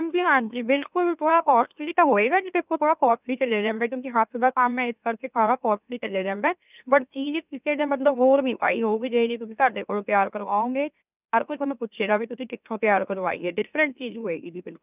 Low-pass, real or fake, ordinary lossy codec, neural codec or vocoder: 3.6 kHz; fake; none; autoencoder, 44.1 kHz, a latent of 192 numbers a frame, MeloTTS